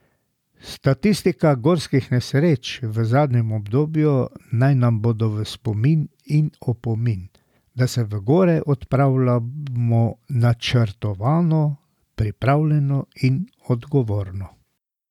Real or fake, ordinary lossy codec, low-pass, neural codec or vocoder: real; none; 19.8 kHz; none